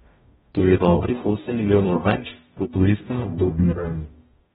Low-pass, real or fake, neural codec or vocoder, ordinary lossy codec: 19.8 kHz; fake; codec, 44.1 kHz, 0.9 kbps, DAC; AAC, 16 kbps